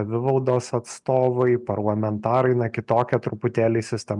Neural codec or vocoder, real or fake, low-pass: none; real; 10.8 kHz